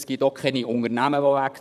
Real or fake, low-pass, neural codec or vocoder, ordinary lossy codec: fake; 14.4 kHz; vocoder, 44.1 kHz, 128 mel bands, Pupu-Vocoder; none